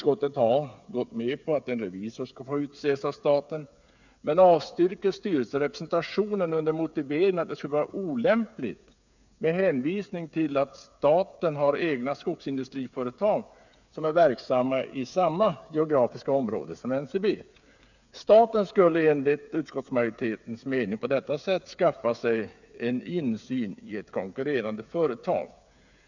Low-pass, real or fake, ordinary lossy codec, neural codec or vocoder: 7.2 kHz; fake; none; codec, 16 kHz, 8 kbps, FreqCodec, smaller model